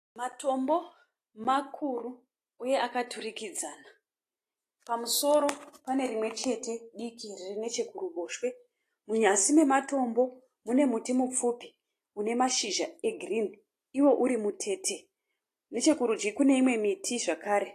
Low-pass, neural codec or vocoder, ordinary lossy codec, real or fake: 14.4 kHz; none; AAC, 48 kbps; real